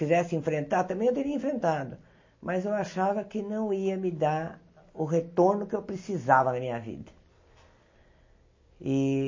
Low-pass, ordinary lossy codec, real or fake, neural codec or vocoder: 7.2 kHz; MP3, 32 kbps; real; none